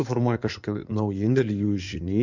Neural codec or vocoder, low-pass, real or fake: codec, 16 kHz in and 24 kHz out, 2.2 kbps, FireRedTTS-2 codec; 7.2 kHz; fake